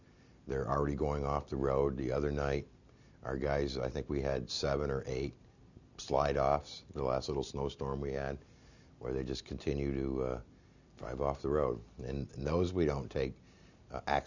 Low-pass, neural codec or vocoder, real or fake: 7.2 kHz; none; real